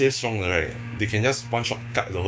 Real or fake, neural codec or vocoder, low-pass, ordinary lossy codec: fake; codec, 16 kHz, 6 kbps, DAC; none; none